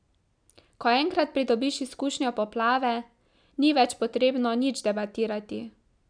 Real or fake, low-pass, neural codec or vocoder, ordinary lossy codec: real; 9.9 kHz; none; none